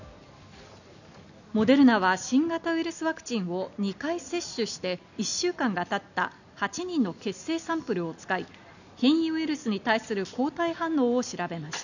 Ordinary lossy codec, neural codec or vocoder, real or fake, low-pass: none; none; real; 7.2 kHz